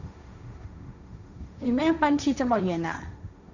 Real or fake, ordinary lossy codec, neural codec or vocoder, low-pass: fake; none; codec, 16 kHz, 1.1 kbps, Voila-Tokenizer; 7.2 kHz